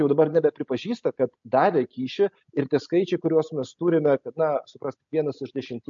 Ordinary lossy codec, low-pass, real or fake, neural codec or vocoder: MP3, 48 kbps; 7.2 kHz; real; none